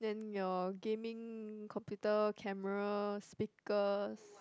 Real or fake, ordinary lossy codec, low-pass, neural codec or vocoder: real; none; none; none